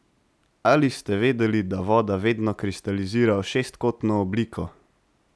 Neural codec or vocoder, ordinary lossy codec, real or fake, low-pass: none; none; real; none